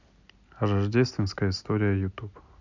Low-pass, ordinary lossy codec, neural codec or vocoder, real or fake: 7.2 kHz; none; none; real